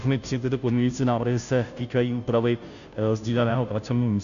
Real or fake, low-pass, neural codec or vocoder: fake; 7.2 kHz; codec, 16 kHz, 0.5 kbps, FunCodec, trained on Chinese and English, 25 frames a second